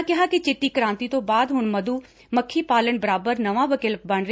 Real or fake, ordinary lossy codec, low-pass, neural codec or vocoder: real; none; none; none